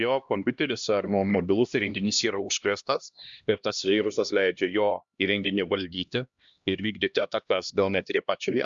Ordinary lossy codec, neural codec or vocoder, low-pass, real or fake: Opus, 64 kbps; codec, 16 kHz, 1 kbps, X-Codec, HuBERT features, trained on LibriSpeech; 7.2 kHz; fake